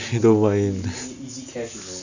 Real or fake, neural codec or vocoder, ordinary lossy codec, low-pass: real; none; none; 7.2 kHz